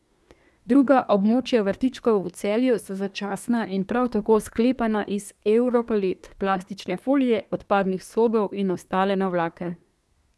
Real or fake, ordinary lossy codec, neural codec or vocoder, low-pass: fake; none; codec, 24 kHz, 1 kbps, SNAC; none